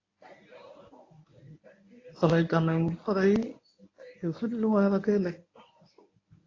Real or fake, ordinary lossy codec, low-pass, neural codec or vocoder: fake; AAC, 32 kbps; 7.2 kHz; codec, 24 kHz, 0.9 kbps, WavTokenizer, medium speech release version 1